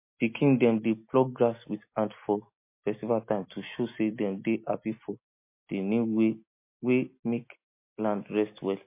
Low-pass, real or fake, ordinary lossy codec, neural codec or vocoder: 3.6 kHz; real; MP3, 24 kbps; none